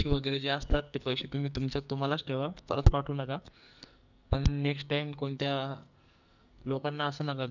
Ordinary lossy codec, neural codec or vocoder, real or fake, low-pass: none; codec, 44.1 kHz, 2.6 kbps, SNAC; fake; 7.2 kHz